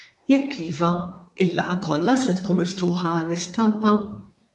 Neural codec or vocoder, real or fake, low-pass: codec, 24 kHz, 1 kbps, SNAC; fake; 10.8 kHz